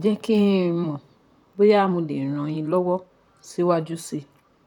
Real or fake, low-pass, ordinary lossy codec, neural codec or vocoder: fake; 19.8 kHz; none; vocoder, 44.1 kHz, 128 mel bands, Pupu-Vocoder